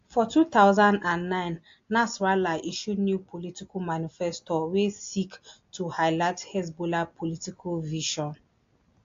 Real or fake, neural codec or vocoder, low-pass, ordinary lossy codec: real; none; 7.2 kHz; AAC, 48 kbps